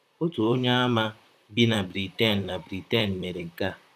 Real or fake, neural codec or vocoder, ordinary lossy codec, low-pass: fake; vocoder, 44.1 kHz, 128 mel bands, Pupu-Vocoder; none; 14.4 kHz